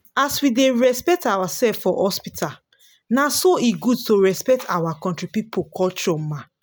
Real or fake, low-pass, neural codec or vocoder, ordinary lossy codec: real; none; none; none